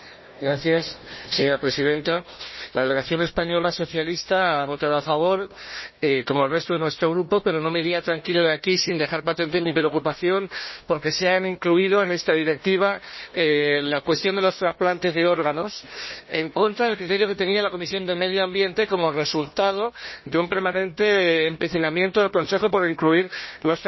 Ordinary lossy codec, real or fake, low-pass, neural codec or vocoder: MP3, 24 kbps; fake; 7.2 kHz; codec, 16 kHz, 1 kbps, FunCodec, trained on Chinese and English, 50 frames a second